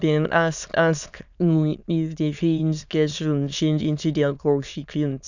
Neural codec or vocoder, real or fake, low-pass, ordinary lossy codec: autoencoder, 22.05 kHz, a latent of 192 numbers a frame, VITS, trained on many speakers; fake; 7.2 kHz; none